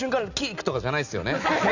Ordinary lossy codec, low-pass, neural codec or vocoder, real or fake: none; 7.2 kHz; none; real